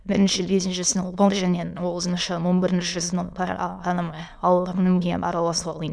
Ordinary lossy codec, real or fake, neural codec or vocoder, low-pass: none; fake; autoencoder, 22.05 kHz, a latent of 192 numbers a frame, VITS, trained on many speakers; none